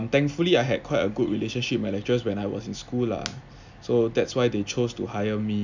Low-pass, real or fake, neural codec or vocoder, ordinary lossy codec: 7.2 kHz; real; none; none